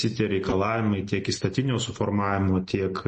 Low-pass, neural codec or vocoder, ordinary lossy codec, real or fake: 9.9 kHz; none; MP3, 32 kbps; real